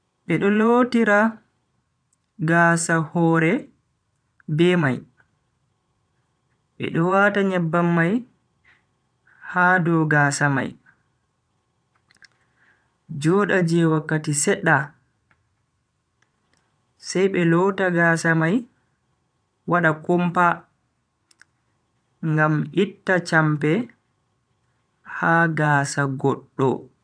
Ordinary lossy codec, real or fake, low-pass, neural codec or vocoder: none; fake; 9.9 kHz; vocoder, 22.05 kHz, 80 mel bands, Vocos